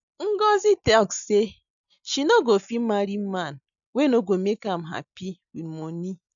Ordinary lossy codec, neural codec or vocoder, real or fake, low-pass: none; none; real; 7.2 kHz